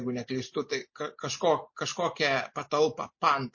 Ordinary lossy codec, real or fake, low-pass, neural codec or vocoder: MP3, 32 kbps; real; 7.2 kHz; none